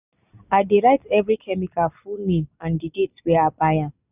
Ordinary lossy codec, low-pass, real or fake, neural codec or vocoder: none; 3.6 kHz; real; none